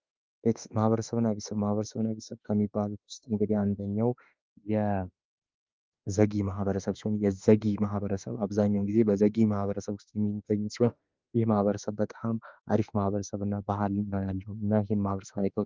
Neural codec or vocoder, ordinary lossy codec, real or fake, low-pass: autoencoder, 48 kHz, 32 numbers a frame, DAC-VAE, trained on Japanese speech; Opus, 24 kbps; fake; 7.2 kHz